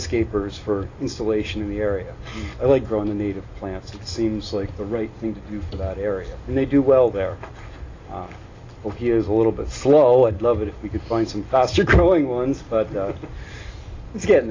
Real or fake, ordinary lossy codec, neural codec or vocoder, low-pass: real; AAC, 32 kbps; none; 7.2 kHz